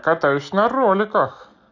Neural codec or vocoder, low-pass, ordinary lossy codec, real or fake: none; 7.2 kHz; none; real